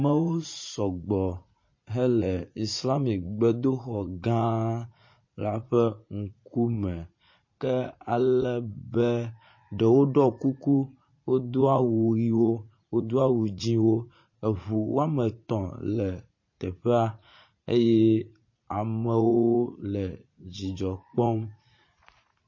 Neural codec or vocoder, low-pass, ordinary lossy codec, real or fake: vocoder, 44.1 kHz, 80 mel bands, Vocos; 7.2 kHz; MP3, 32 kbps; fake